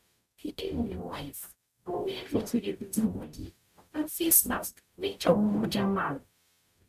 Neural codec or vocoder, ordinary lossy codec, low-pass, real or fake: codec, 44.1 kHz, 0.9 kbps, DAC; none; 14.4 kHz; fake